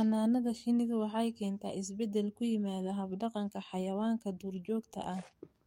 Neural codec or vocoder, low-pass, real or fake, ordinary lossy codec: codec, 44.1 kHz, 7.8 kbps, DAC; 19.8 kHz; fake; MP3, 64 kbps